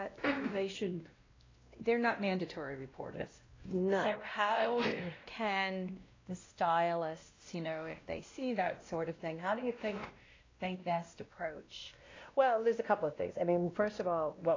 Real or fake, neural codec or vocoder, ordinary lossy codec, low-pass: fake; codec, 16 kHz, 1 kbps, X-Codec, WavLM features, trained on Multilingual LibriSpeech; AAC, 32 kbps; 7.2 kHz